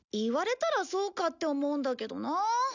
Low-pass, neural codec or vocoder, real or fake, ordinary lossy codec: 7.2 kHz; none; real; none